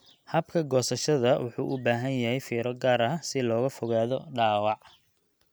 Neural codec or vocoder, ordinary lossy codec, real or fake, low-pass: none; none; real; none